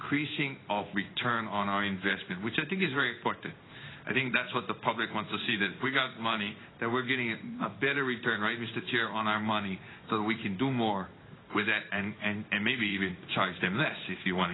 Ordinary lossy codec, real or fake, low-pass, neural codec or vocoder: AAC, 16 kbps; fake; 7.2 kHz; codec, 16 kHz in and 24 kHz out, 1 kbps, XY-Tokenizer